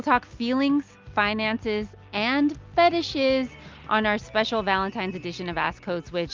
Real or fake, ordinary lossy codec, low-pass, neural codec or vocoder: real; Opus, 24 kbps; 7.2 kHz; none